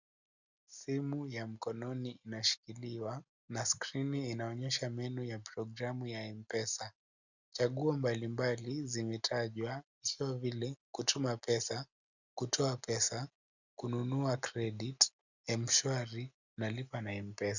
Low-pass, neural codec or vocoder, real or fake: 7.2 kHz; none; real